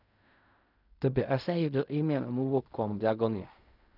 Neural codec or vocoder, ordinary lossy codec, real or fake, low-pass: codec, 16 kHz in and 24 kHz out, 0.4 kbps, LongCat-Audio-Codec, fine tuned four codebook decoder; none; fake; 5.4 kHz